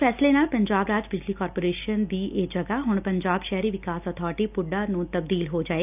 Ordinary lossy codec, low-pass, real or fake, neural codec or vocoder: none; 3.6 kHz; real; none